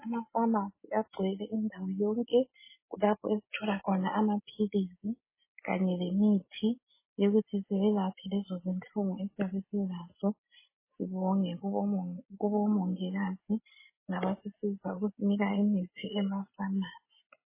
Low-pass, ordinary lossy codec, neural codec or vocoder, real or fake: 3.6 kHz; MP3, 16 kbps; none; real